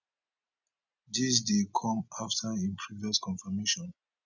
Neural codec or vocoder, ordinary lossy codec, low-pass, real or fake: none; none; 7.2 kHz; real